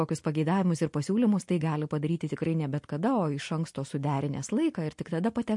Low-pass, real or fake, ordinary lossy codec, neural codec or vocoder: 10.8 kHz; real; MP3, 48 kbps; none